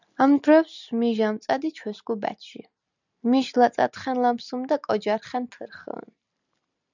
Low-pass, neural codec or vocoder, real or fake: 7.2 kHz; none; real